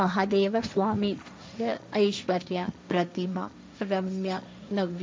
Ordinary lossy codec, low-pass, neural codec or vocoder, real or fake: none; none; codec, 16 kHz, 1.1 kbps, Voila-Tokenizer; fake